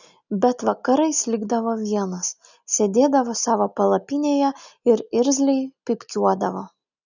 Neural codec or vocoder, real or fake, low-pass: none; real; 7.2 kHz